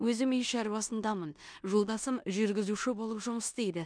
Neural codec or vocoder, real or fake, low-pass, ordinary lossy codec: codec, 16 kHz in and 24 kHz out, 0.9 kbps, LongCat-Audio-Codec, four codebook decoder; fake; 9.9 kHz; MP3, 96 kbps